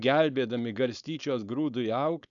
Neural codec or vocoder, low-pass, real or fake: codec, 16 kHz, 4.8 kbps, FACodec; 7.2 kHz; fake